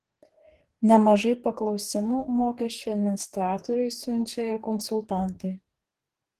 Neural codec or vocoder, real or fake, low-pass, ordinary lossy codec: codec, 44.1 kHz, 2.6 kbps, DAC; fake; 14.4 kHz; Opus, 16 kbps